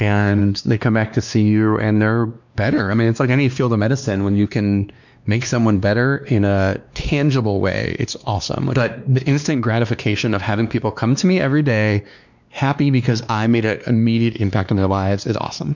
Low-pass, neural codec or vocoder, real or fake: 7.2 kHz; codec, 16 kHz, 2 kbps, X-Codec, WavLM features, trained on Multilingual LibriSpeech; fake